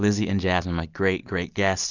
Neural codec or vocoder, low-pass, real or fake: none; 7.2 kHz; real